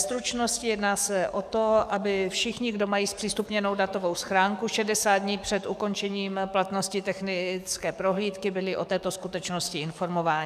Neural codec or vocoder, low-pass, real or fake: codec, 44.1 kHz, 7.8 kbps, DAC; 14.4 kHz; fake